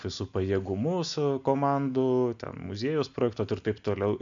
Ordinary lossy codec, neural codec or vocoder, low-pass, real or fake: MP3, 64 kbps; none; 7.2 kHz; real